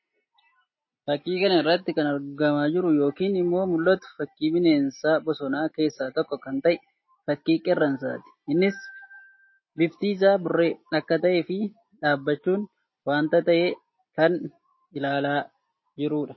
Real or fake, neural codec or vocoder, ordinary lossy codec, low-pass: real; none; MP3, 24 kbps; 7.2 kHz